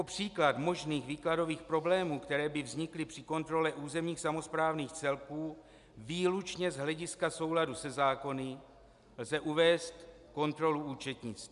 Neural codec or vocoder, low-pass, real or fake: none; 10.8 kHz; real